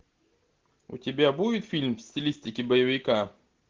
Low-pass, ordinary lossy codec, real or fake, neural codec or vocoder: 7.2 kHz; Opus, 16 kbps; real; none